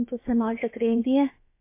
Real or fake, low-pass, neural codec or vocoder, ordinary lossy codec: fake; 3.6 kHz; codec, 16 kHz, about 1 kbps, DyCAST, with the encoder's durations; MP3, 24 kbps